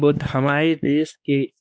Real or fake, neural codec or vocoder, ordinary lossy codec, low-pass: fake; codec, 16 kHz, 2 kbps, X-Codec, WavLM features, trained on Multilingual LibriSpeech; none; none